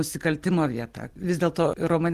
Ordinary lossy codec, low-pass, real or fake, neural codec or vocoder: Opus, 24 kbps; 14.4 kHz; real; none